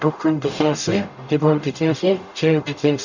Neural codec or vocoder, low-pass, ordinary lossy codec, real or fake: codec, 44.1 kHz, 0.9 kbps, DAC; 7.2 kHz; none; fake